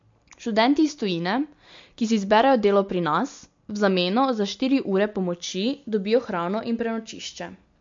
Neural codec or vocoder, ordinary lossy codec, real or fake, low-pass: none; MP3, 48 kbps; real; 7.2 kHz